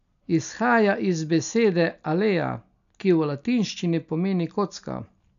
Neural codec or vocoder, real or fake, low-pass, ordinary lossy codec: none; real; 7.2 kHz; none